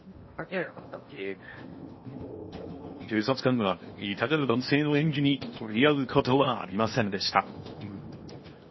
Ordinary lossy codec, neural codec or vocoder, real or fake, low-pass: MP3, 24 kbps; codec, 16 kHz in and 24 kHz out, 0.8 kbps, FocalCodec, streaming, 65536 codes; fake; 7.2 kHz